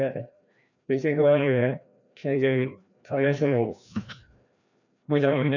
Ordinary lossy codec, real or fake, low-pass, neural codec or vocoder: none; fake; 7.2 kHz; codec, 16 kHz, 1 kbps, FreqCodec, larger model